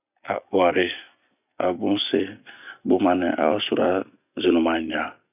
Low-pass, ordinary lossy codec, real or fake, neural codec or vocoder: 3.6 kHz; none; real; none